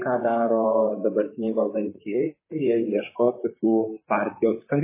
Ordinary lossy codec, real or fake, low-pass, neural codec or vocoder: MP3, 16 kbps; fake; 3.6 kHz; vocoder, 44.1 kHz, 128 mel bands, Pupu-Vocoder